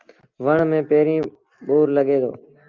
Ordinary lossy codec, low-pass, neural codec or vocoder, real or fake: Opus, 32 kbps; 7.2 kHz; none; real